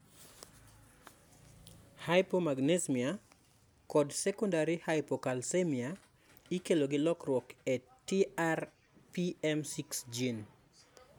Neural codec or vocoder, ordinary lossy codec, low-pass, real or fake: none; none; none; real